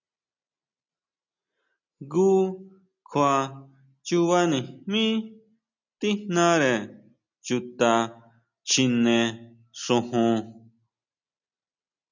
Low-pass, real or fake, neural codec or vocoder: 7.2 kHz; real; none